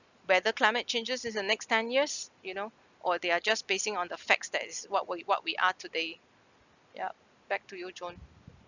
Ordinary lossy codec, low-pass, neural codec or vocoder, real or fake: none; 7.2 kHz; none; real